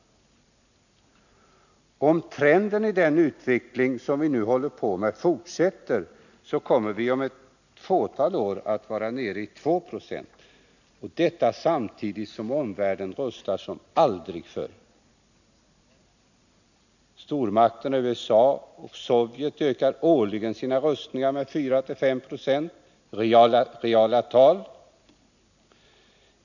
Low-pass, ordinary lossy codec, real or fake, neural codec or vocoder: 7.2 kHz; none; real; none